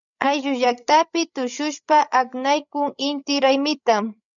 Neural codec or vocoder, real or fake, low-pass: codec, 16 kHz, 16 kbps, FreqCodec, larger model; fake; 7.2 kHz